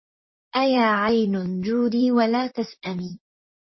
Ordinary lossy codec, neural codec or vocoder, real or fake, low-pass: MP3, 24 kbps; vocoder, 44.1 kHz, 128 mel bands, Pupu-Vocoder; fake; 7.2 kHz